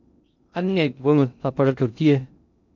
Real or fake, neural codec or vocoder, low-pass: fake; codec, 16 kHz in and 24 kHz out, 0.6 kbps, FocalCodec, streaming, 2048 codes; 7.2 kHz